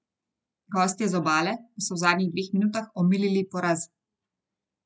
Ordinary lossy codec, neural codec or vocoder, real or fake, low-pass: none; none; real; none